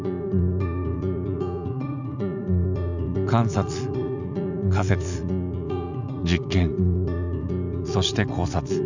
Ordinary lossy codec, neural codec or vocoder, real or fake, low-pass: none; vocoder, 44.1 kHz, 80 mel bands, Vocos; fake; 7.2 kHz